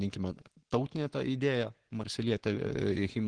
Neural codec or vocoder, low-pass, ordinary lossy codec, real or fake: codec, 44.1 kHz, 7.8 kbps, Pupu-Codec; 9.9 kHz; Opus, 16 kbps; fake